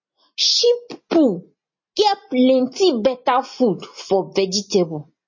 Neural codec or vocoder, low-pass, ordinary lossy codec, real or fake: none; 7.2 kHz; MP3, 32 kbps; real